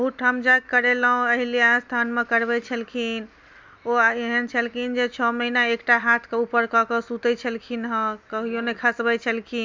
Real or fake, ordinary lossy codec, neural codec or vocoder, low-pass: real; none; none; none